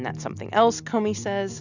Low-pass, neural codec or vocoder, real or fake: 7.2 kHz; none; real